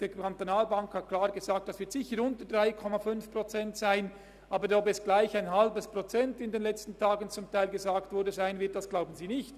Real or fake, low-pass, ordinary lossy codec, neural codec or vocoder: real; 14.4 kHz; Opus, 64 kbps; none